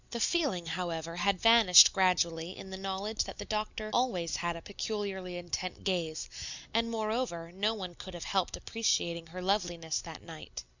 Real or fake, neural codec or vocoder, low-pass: real; none; 7.2 kHz